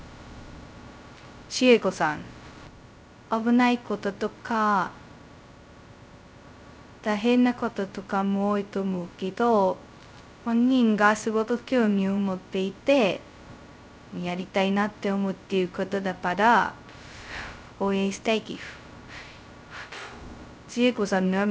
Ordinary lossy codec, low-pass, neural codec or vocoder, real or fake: none; none; codec, 16 kHz, 0.2 kbps, FocalCodec; fake